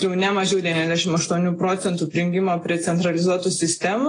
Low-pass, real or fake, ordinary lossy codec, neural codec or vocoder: 9.9 kHz; real; AAC, 32 kbps; none